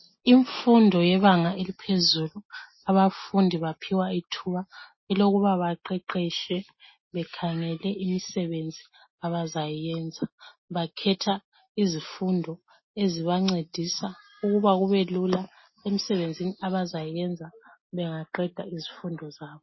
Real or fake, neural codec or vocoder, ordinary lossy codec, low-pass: real; none; MP3, 24 kbps; 7.2 kHz